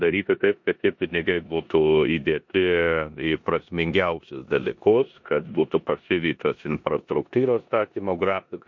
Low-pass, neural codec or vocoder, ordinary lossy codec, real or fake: 7.2 kHz; codec, 16 kHz in and 24 kHz out, 0.9 kbps, LongCat-Audio-Codec, four codebook decoder; MP3, 48 kbps; fake